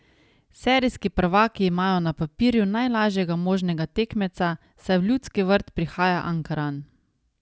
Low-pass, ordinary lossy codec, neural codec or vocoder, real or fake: none; none; none; real